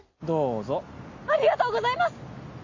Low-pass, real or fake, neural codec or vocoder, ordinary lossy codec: 7.2 kHz; real; none; AAC, 48 kbps